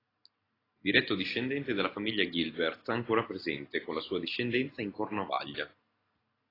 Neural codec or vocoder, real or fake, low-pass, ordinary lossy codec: none; real; 5.4 kHz; AAC, 24 kbps